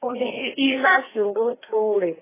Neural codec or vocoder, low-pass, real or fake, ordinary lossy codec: codec, 16 kHz, 1 kbps, FreqCodec, larger model; 3.6 kHz; fake; AAC, 16 kbps